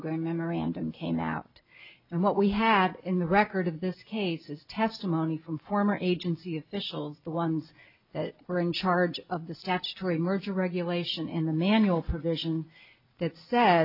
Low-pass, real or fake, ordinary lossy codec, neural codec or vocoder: 5.4 kHz; real; AAC, 48 kbps; none